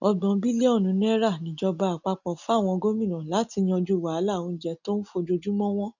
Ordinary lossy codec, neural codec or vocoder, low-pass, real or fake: none; none; 7.2 kHz; real